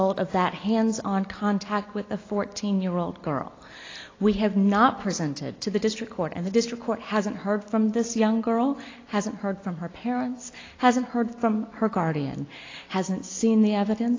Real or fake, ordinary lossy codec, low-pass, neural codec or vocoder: real; AAC, 32 kbps; 7.2 kHz; none